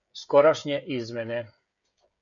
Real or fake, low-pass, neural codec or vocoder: fake; 7.2 kHz; codec, 16 kHz, 16 kbps, FreqCodec, smaller model